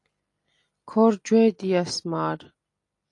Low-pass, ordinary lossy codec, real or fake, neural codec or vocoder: 10.8 kHz; AAC, 48 kbps; real; none